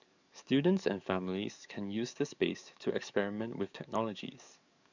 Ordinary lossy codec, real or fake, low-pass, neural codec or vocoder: none; fake; 7.2 kHz; codec, 44.1 kHz, 7.8 kbps, DAC